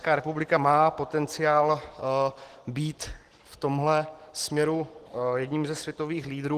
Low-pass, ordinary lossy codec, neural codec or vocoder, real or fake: 14.4 kHz; Opus, 16 kbps; none; real